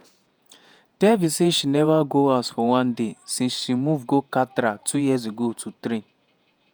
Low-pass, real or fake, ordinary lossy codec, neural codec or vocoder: none; fake; none; vocoder, 48 kHz, 128 mel bands, Vocos